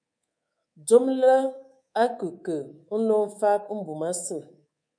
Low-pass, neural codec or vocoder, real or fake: 9.9 kHz; codec, 24 kHz, 3.1 kbps, DualCodec; fake